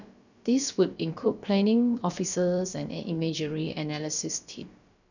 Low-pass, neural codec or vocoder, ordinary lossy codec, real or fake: 7.2 kHz; codec, 16 kHz, about 1 kbps, DyCAST, with the encoder's durations; none; fake